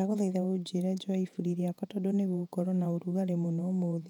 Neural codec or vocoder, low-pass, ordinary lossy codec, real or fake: vocoder, 48 kHz, 128 mel bands, Vocos; 19.8 kHz; none; fake